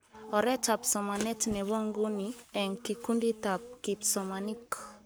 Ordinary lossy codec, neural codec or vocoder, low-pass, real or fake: none; codec, 44.1 kHz, 7.8 kbps, Pupu-Codec; none; fake